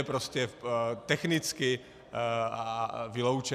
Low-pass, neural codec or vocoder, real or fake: 14.4 kHz; none; real